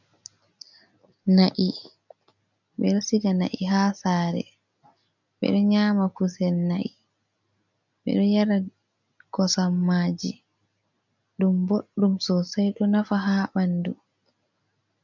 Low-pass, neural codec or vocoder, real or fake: 7.2 kHz; none; real